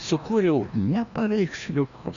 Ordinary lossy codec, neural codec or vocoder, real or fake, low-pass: Opus, 64 kbps; codec, 16 kHz, 1 kbps, FreqCodec, larger model; fake; 7.2 kHz